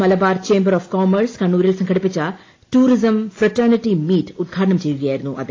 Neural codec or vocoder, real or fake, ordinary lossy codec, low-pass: none; real; AAC, 32 kbps; 7.2 kHz